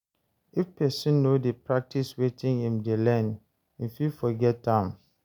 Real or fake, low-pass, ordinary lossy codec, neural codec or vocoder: real; 19.8 kHz; none; none